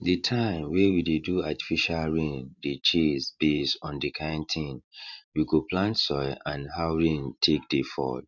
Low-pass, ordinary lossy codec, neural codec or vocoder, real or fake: 7.2 kHz; none; vocoder, 44.1 kHz, 128 mel bands every 512 samples, BigVGAN v2; fake